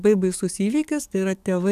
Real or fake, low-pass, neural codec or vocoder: fake; 14.4 kHz; codec, 44.1 kHz, 7.8 kbps, DAC